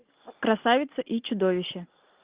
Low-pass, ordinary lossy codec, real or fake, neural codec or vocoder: 3.6 kHz; Opus, 24 kbps; real; none